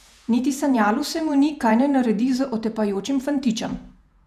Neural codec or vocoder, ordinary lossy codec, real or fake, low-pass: vocoder, 48 kHz, 128 mel bands, Vocos; none; fake; 14.4 kHz